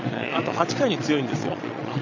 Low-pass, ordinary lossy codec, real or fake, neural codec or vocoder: 7.2 kHz; none; fake; vocoder, 22.05 kHz, 80 mel bands, Vocos